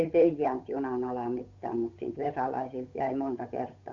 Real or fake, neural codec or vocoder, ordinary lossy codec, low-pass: fake; codec, 16 kHz, 8 kbps, FunCodec, trained on Chinese and English, 25 frames a second; Opus, 64 kbps; 7.2 kHz